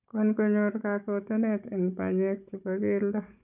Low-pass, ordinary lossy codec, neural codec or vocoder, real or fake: 3.6 kHz; none; codec, 16 kHz, 16 kbps, FunCodec, trained on Chinese and English, 50 frames a second; fake